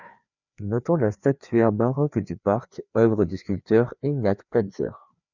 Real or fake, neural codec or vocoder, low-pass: fake; codec, 16 kHz, 2 kbps, FreqCodec, larger model; 7.2 kHz